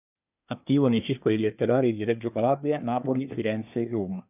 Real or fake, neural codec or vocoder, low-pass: fake; codec, 24 kHz, 1 kbps, SNAC; 3.6 kHz